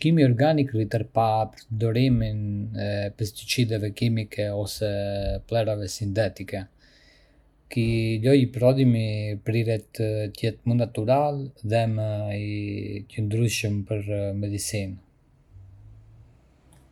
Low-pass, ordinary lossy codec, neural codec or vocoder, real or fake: 19.8 kHz; none; none; real